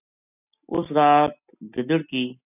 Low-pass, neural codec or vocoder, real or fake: 3.6 kHz; none; real